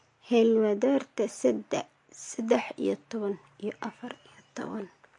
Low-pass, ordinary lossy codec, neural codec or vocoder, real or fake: 10.8 kHz; MP3, 48 kbps; none; real